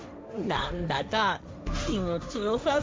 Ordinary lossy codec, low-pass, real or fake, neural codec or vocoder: none; none; fake; codec, 16 kHz, 1.1 kbps, Voila-Tokenizer